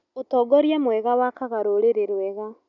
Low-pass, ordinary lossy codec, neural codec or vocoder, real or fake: 7.2 kHz; none; none; real